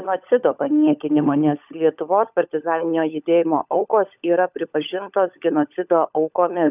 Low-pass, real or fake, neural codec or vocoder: 3.6 kHz; fake; codec, 16 kHz, 16 kbps, FunCodec, trained on LibriTTS, 50 frames a second